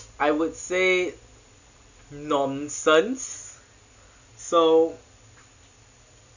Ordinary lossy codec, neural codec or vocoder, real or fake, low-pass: none; none; real; 7.2 kHz